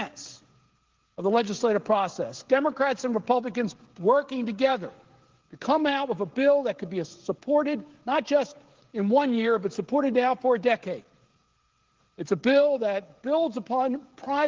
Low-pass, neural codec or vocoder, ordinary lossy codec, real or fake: 7.2 kHz; codec, 16 kHz, 16 kbps, FreqCodec, smaller model; Opus, 16 kbps; fake